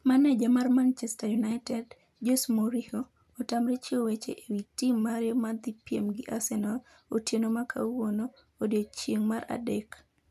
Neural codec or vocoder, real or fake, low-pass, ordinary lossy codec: none; real; 14.4 kHz; none